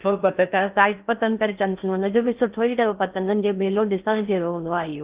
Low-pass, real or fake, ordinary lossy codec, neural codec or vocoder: 3.6 kHz; fake; Opus, 32 kbps; codec, 16 kHz in and 24 kHz out, 0.6 kbps, FocalCodec, streaming, 2048 codes